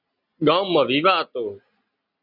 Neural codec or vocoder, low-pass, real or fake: none; 5.4 kHz; real